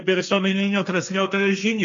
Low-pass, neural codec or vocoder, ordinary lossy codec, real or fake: 7.2 kHz; codec, 16 kHz, 1.1 kbps, Voila-Tokenizer; MP3, 64 kbps; fake